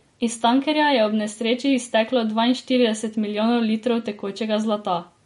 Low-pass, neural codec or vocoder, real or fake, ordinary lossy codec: 19.8 kHz; none; real; MP3, 48 kbps